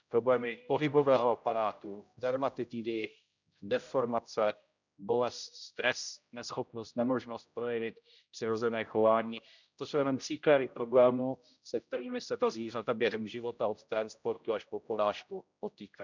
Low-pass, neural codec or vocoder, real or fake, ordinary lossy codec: 7.2 kHz; codec, 16 kHz, 0.5 kbps, X-Codec, HuBERT features, trained on general audio; fake; none